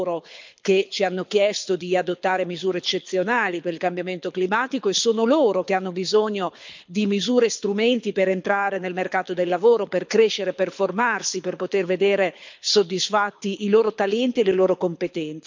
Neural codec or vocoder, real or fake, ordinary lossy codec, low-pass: codec, 24 kHz, 6 kbps, HILCodec; fake; MP3, 64 kbps; 7.2 kHz